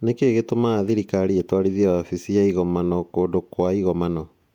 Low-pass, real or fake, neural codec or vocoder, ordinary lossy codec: 19.8 kHz; fake; vocoder, 48 kHz, 128 mel bands, Vocos; MP3, 96 kbps